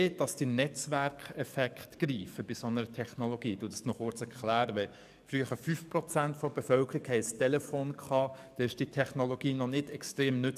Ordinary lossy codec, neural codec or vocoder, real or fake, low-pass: none; codec, 44.1 kHz, 7.8 kbps, DAC; fake; 14.4 kHz